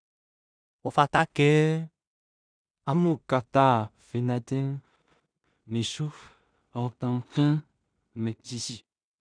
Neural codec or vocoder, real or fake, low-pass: codec, 16 kHz in and 24 kHz out, 0.4 kbps, LongCat-Audio-Codec, two codebook decoder; fake; 9.9 kHz